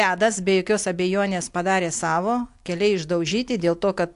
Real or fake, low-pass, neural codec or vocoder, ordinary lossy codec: real; 10.8 kHz; none; AAC, 64 kbps